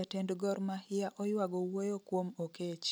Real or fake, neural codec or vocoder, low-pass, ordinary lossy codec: real; none; none; none